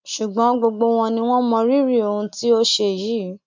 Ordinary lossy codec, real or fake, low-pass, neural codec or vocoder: MP3, 64 kbps; real; 7.2 kHz; none